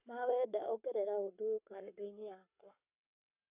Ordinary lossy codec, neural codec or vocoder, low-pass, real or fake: none; codec, 44.1 kHz, 7.8 kbps, Pupu-Codec; 3.6 kHz; fake